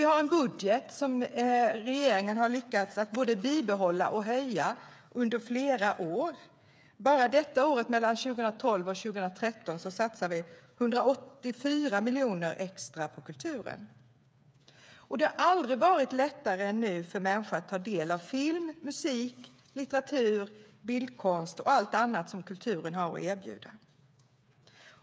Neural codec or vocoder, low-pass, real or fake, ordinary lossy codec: codec, 16 kHz, 8 kbps, FreqCodec, smaller model; none; fake; none